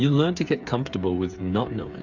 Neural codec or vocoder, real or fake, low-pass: codec, 16 kHz, 8 kbps, FreqCodec, smaller model; fake; 7.2 kHz